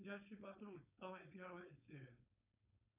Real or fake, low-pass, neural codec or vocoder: fake; 3.6 kHz; codec, 16 kHz, 4.8 kbps, FACodec